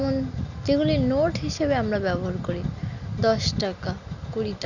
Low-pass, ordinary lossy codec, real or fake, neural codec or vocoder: 7.2 kHz; none; real; none